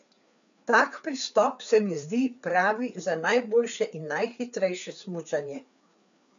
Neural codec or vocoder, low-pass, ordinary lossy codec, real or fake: codec, 16 kHz, 4 kbps, FreqCodec, larger model; 7.2 kHz; none; fake